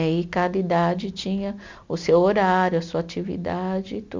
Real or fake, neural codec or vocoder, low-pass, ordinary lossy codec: real; none; 7.2 kHz; MP3, 64 kbps